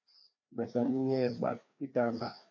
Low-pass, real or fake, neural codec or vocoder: 7.2 kHz; fake; codec, 16 kHz, 2 kbps, FreqCodec, larger model